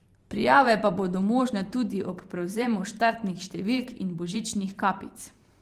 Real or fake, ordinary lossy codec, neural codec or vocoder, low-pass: fake; Opus, 24 kbps; vocoder, 44.1 kHz, 128 mel bands every 512 samples, BigVGAN v2; 14.4 kHz